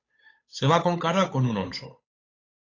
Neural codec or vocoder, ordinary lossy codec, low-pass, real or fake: codec, 16 kHz, 8 kbps, FunCodec, trained on Chinese and English, 25 frames a second; AAC, 32 kbps; 7.2 kHz; fake